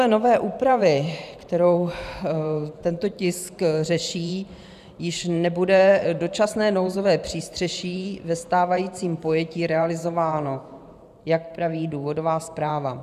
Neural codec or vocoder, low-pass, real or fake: vocoder, 44.1 kHz, 128 mel bands every 512 samples, BigVGAN v2; 14.4 kHz; fake